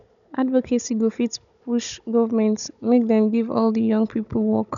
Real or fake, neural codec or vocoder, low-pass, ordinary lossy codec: fake; codec, 16 kHz, 16 kbps, FunCodec, trained on Chinese and English, 50 frames a second; 7.2 kHz; none